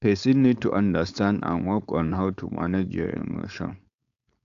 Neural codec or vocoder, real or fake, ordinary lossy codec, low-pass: codec, 16 kHz, 4.8 kbps, FACodec; fake; AAC, 64 kbps; 7.2 kHz